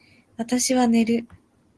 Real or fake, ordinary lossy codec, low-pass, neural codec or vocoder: real; Opus, 16 kbps; 10.8 kHz; none